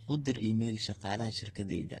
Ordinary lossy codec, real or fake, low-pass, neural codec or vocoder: AAC, 32 kbps; fake; 14.4 kHz; codec, 32 kHz, 1.9 kbps, SNAC